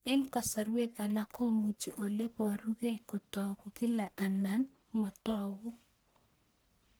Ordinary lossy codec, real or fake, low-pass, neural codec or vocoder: none; fake; none; codec, 44.1 kHz, 1.7 kbps, Pupu-Codec